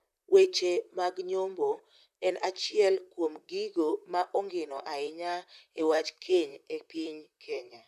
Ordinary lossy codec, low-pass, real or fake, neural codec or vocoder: none; 14.4 kHz; fake; vocoder, 44.1 kHz, 128 mel bands, Pupu-Vocoder